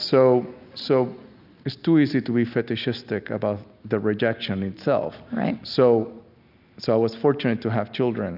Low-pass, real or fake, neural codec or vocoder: 5.4 kHz; real; none